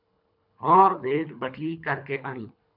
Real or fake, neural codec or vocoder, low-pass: fake; codec, 24 kHz, 3 kbps, HILCodec; 5.4 kHz